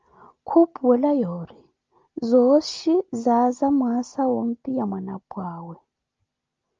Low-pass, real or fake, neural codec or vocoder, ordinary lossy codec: 7.2 kHz; real; none; Opus, 24 kbps